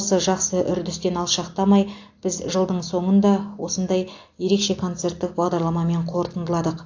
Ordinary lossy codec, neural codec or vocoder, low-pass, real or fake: AAC, 48 kbps; none; 7.2 kHz; real